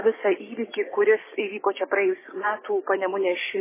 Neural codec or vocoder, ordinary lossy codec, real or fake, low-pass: codec, 16 kHz, 6 kbps, DAC; MP3, 16 kbps; fake; 3.6 kHz